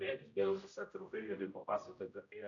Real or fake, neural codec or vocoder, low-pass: fake; codec, 16 kHz, 0.5 kbps, X-Codec, HuBERT features, trained on general audio; 7.2 kHz